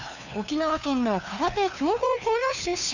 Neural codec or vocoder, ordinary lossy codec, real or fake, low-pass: codec, 16 kHz, 2 kbps, FunCodec, trained on LibriTTS, 25 frames a second; none; fake; 7.2 kHz